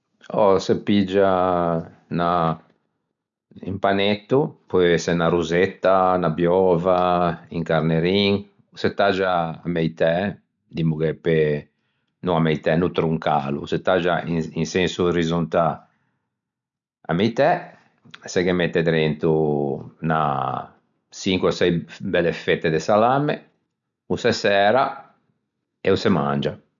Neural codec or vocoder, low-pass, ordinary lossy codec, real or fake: none; 7.2 kHz; none; real